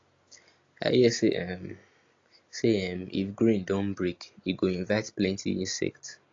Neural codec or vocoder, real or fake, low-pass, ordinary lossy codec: none; real; 7.2 kHz; AAC, 32 kbps